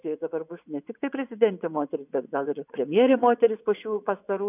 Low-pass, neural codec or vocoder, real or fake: 3.6 kHz; vocoder, 24 kHz, 100 mel bands, Vocos; fake